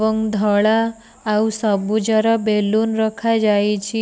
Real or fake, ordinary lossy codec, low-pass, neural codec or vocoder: real; none; none; none